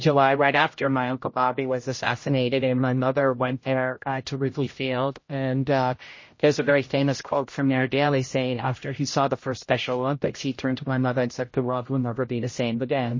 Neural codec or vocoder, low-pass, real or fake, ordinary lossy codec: codec, 16 kHz, 0.5 kbps, X-Codec, HuBERT features, trained on general audio; 7.2 kHz; fake; MP3, 32 kbps